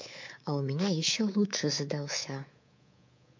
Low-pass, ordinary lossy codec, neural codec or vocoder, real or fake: 7.2 kHz; MP3, 48 kbps; codec, 24 kHz, 3.1 kbps, DualCodec; fake